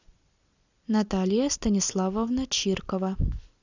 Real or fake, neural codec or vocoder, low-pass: real; none; 7.2 kHz